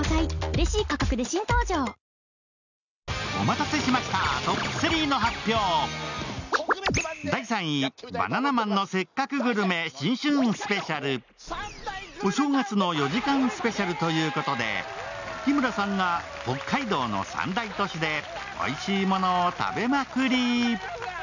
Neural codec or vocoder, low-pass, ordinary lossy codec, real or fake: none; 7.2 kHz; none; real